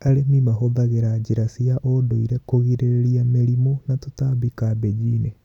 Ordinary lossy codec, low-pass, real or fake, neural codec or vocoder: none; 19.8 kHz; real; none